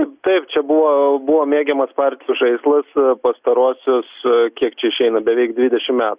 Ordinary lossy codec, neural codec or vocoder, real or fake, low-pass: Opus, 64 kbps; none; real; 3.6 kHz